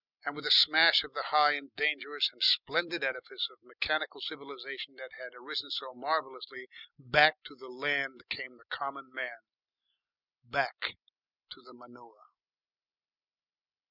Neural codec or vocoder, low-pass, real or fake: none; 5.4 kHz; real